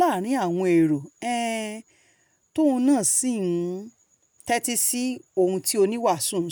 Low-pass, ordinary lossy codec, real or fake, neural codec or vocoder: none; none; real; none